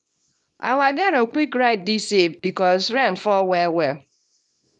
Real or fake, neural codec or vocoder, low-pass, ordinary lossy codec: fake; codec, 24 kHz, 0.9 kbps, WavTokenizer, small release; 10.8 kHz; none